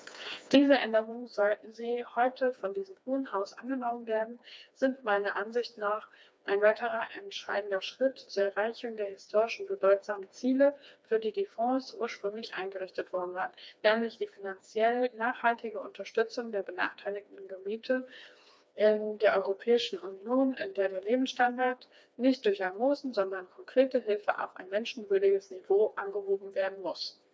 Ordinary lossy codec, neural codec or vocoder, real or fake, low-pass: none; codec, 16 kHz, 2 kbps, FreqCodec, smaller model; fake; none